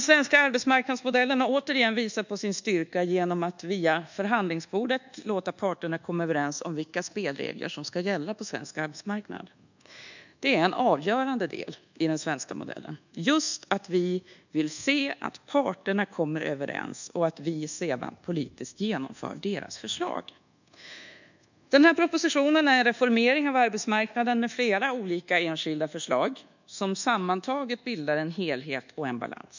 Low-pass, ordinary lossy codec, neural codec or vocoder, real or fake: 7.2 kHz; none; codec, 24 kHz, 1.2 kbps, DualCodec; fake